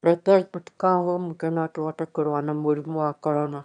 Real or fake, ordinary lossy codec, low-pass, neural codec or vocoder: fake; none; 9.9 kHz; autoencoder, 22.05 kHz, a latent of 192 numbers a frame, VITS, trained on one speaker